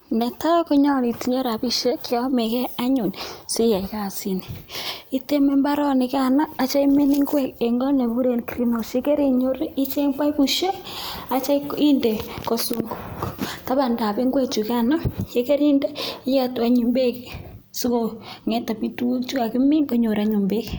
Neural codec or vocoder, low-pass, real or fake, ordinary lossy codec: none; none; real; none